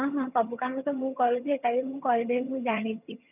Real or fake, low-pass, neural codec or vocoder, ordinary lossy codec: fake; 3.6 kHz; vocoder, 22.05 kHz, 80 mel bands, HiFi-GAN; none